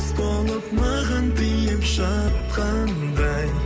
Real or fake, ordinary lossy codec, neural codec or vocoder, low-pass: real; none; none; none